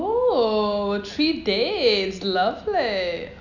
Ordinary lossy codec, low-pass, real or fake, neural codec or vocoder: none; 7.2 kHz; real; none